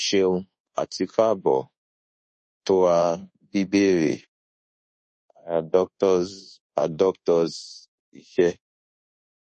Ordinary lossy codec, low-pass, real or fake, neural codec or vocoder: MP3, 32 kbps; 10.8 kHz; fake; codec, 24 kHz, 1.2 kbps, DualCodec